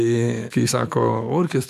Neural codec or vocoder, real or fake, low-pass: autoencoder, 48 kHz, 128 numbers a frame, DAC-VAE, trained on Japanese speech; fake; 14.4 kHz